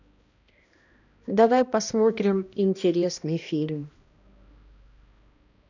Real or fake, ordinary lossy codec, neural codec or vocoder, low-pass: fake; none; codec, 16 kHz, 1 kbps, X-Codec, HuBERT features, trained on balanced general audio; 7.2 kHz